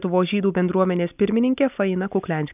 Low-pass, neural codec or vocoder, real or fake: 3.6 kHz; none; real